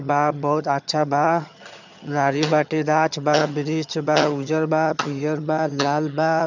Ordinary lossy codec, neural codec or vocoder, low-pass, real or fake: none; vocoder, 22.05 kHz, 80 mel bands, HiFi-GAN; 7.2 kHz; fake